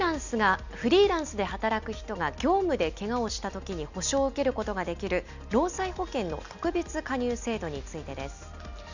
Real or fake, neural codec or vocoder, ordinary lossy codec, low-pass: real; none; none; 7.2 kHz